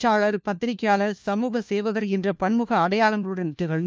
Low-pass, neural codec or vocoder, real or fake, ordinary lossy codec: none; codec, 16 kHz, 1 kbps, FunCodec, trained on LibriTTS, 50 frames a second; fake; none